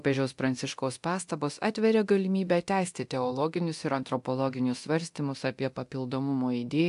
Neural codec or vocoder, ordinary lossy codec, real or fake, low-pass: codec, 24 kHz, 0.9 kbps, DualCodec; AAC, 64 kbps; fake; 10.8 kHz